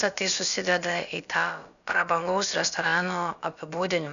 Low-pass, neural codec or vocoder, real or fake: 7.2 kHz; codec, 16 kHz, about 1 kbps, DyCAST, with the encoder's durations; fake